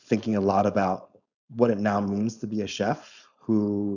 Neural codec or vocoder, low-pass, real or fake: codec, 16 kHz, 4.8 kbps, FACodec; 7.2 kHz; fake